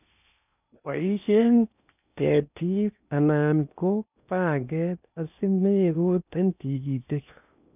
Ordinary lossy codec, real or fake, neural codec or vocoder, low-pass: none; fake; codec, 16 kHz in and 24 kHz out, 0.6 kbps, FocalCodec, streaming, 4096 codes; 3.6 kHz